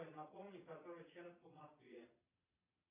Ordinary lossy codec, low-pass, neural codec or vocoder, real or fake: AAC, 16 kbps; 3.6 kHz; vocoder, 22.05 kHz, 80 mel bands, Vocos; fake